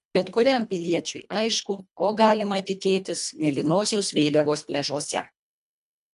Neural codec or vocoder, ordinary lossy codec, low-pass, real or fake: codec, 24 kHz, 1.5 kbps, HILCodec; MP3, 96 kbps; 10.8 kHz; fake